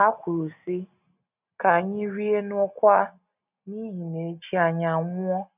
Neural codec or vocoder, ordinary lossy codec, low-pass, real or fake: none; none; 3.6 kHz; real